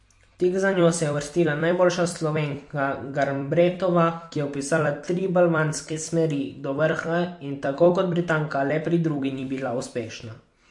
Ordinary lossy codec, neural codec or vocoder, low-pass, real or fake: MP3, 48 kbps; vocoder, 44.1 kHz, 128 mel bands every 256 samples, BigVGAN v2; 10.8 kHz; fake